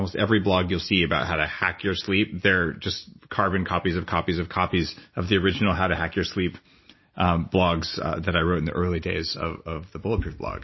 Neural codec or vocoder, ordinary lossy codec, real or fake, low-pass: none; MP3, 24 kbps; real; 7.2 kHz